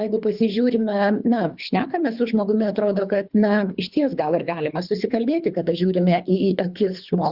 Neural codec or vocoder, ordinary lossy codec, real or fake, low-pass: codec, 24 kHz, 3 kbps, HILCodec; Opus, 64 kbps; fake; 5.4 kHz